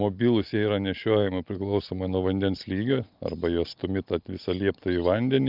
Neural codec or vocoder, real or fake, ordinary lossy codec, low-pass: none; real; Opus, 24 kbps; 5.4 kHz